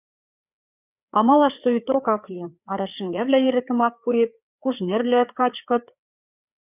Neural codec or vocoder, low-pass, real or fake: codec, 16 kHz, 4 kbps, FreqCodec, larger model; 3.6 kHz; fake